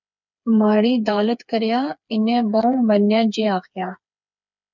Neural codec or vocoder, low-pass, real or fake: codec, 16 kHz, 4 kbps, FreqCodec, smaller model; 7.2 kHz; fake